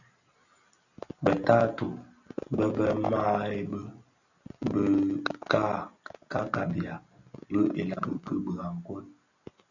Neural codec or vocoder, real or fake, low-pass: none; real; 7.2 kHz